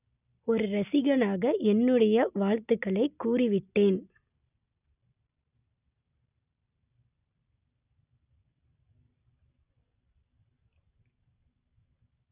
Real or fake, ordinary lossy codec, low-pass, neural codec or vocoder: real; none; 3.6 kHz; none